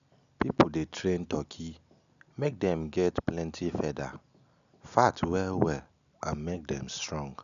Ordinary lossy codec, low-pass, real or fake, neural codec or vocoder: none; 7.2 kHz; real; none